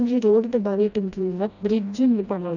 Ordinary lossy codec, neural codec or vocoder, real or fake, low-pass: none; codec, 16 kHz, 1 kbps, FreqCodec, smaller model; fake; 7.2 kHz